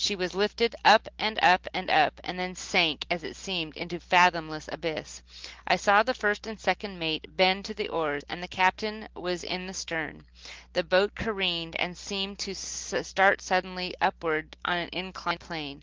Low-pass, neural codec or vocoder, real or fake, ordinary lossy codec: 7.2 kHz; none; real; Opus, 16 kbps